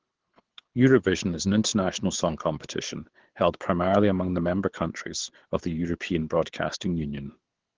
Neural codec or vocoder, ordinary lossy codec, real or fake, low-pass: codec, 24 kHz, 6 kbps, HILCodec; Opus, 16 kbps; fake; 7.2 kHz